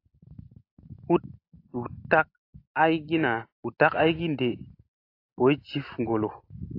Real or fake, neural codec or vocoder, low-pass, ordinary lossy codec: real; none; 5.4 kHz; AAC, 32 kbps